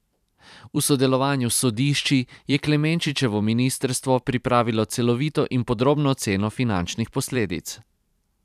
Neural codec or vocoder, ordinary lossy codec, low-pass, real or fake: vocoder, 44.1 kHz, 128 mel bands every 512 samples, BigVGAN v2; none; 14.4 kHz; fake